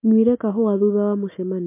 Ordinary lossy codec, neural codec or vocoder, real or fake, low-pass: MP3, 24 kbps; none; real; 3.6 kHz